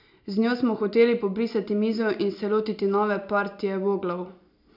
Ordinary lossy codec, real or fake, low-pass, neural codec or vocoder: none; real; 5.4 kHz; none